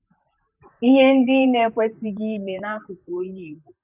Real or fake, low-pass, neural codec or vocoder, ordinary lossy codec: fake; 3.6 kHz; vocoder, 44.1 kHz, 128 mel bands, Pupu-Vocoder; none